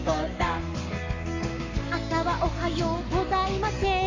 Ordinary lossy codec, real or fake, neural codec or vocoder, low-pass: AAC, 48 kbps; fake; codec, 44.1 kHz, 7.8 kbps, Pupu-Codec; 7.2 kHz